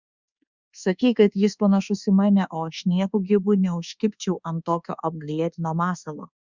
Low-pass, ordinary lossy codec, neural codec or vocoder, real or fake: 7.2 kHz; Opus, 64 kbps; codec, 24 kHz, 1.2 kbps, DualCodec; fake